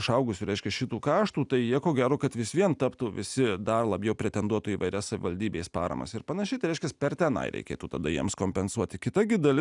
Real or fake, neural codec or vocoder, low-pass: real; none; 10.8 kHz